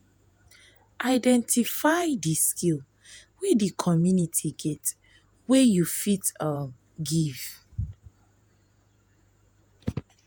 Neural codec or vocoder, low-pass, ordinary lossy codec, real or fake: vocoder, 48 kHz, 128 mel bands, Vocos; none; none; fake